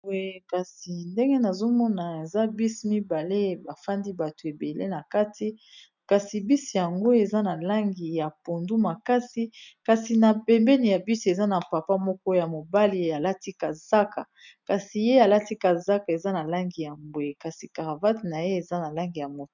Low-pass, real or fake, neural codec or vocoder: 7.2 kHz; real; none